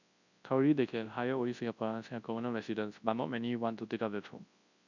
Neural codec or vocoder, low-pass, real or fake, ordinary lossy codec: codec, 24 kHz, 0.9 kbps, WavTokenizer, large speech release; 7.2 kHz; fake; none